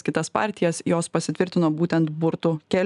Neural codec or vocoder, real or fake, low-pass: none; real; 10.8 kHz